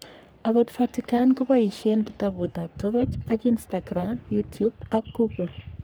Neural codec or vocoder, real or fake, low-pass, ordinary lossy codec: codec, 44.1 kHz, 3.4 kbps, Pupu-Codec; fake; none; none